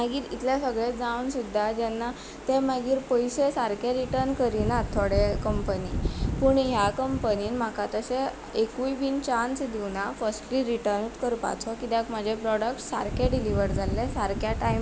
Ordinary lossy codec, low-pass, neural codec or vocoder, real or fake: none; none; none; real